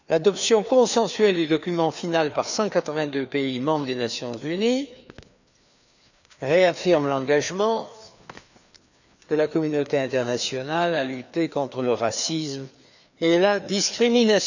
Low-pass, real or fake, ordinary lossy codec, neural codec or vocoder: 7.2 kHz; fake; none; codec, 16 kHz, 2 kbps, FreqCodec, larger model